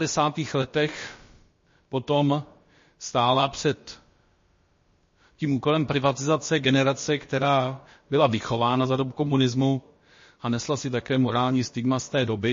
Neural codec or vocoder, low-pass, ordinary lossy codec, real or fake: codec, 16 kHz, about 1 kbps, DyCAST, with the encoder's durations; 7.2 kHz; MP3, 32 kbps; fake